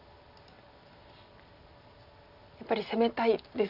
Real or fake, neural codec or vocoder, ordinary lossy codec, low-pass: real; none; none; 5.4 kHz